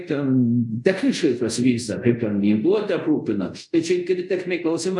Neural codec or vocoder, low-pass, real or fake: codec, 24 kHz, 0.5 kbps, DualCodec; 10.8 kHz; fake